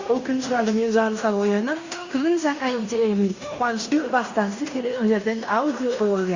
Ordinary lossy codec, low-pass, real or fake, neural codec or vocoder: Opus, 64 kbps; 7.2 kHz; fake; codec, 16 kHz in and 24 kHz out, 0.9 kbps, LongCat-Audio-Codec, fine tuned four codebook decoder